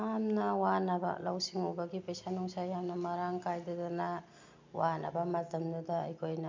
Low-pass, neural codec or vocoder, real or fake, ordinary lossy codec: 7.2 kHz; none; real; MP3, 48 kbps